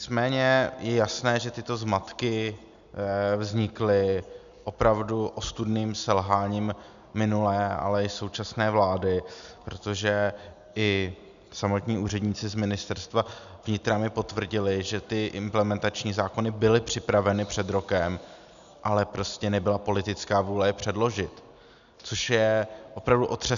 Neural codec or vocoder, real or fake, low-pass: none; real; 7.2 kHz